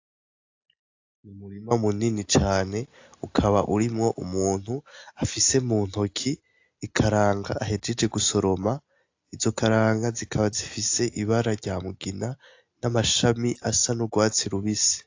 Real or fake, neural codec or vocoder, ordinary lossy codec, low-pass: real; none; AAC, 48 kbps; 7.2 kHz